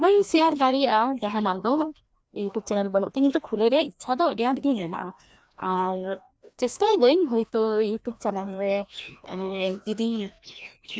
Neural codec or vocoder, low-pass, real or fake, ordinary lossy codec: codec, 16 kHz, 1 kbps, FreqCodec, larger model; none; fake; none